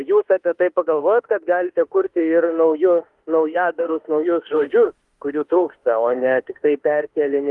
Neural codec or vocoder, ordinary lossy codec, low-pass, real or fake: autoencoder, 48 kHz, 32 numbers a frame, DAC-VAE, trained on Japanese speech; Opus, 24 kbps; 10.8 kHz; fake